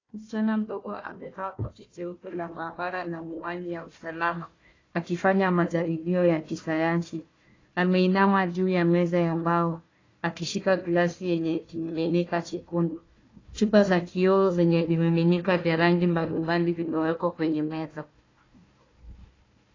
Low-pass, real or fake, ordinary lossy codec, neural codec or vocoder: 7.2 kHz; fake; AAC, 32 kbps; codec, 16 kHz, 1 kbps, FunCodec, trained on Chinese and English, 50 frames a second